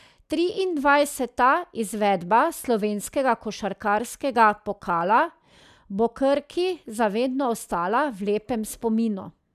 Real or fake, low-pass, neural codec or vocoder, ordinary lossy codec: real; 14.4 kHz; none; none